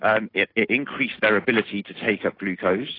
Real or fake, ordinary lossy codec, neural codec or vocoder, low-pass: real; AAC, 24 kbps; none; 5.4 kHz